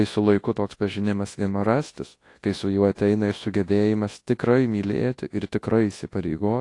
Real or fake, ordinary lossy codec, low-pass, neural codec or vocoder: fake; AAC, 48 kbps; 10.8 kHz; codec, 24 kHz, 0.9 kbps, WavTokenizer, large speech release